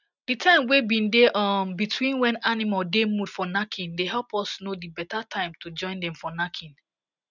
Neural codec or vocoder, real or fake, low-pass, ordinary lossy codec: none; real; 7.2 kHz; none